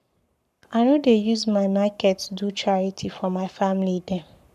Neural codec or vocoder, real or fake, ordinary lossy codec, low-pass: codec, 44.1 kHz, 7.8 kbps, Pupu-Codec; fake; none; 14.4 kHz